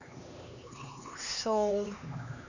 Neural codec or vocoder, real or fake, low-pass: codec, 16 kHz, 2 kbps, X-Codec, HuBERT features, trained on LibriSpeech; fake; 7.2 kHz